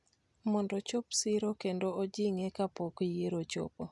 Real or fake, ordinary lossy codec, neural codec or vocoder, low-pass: real; none; none; 10.8 kHz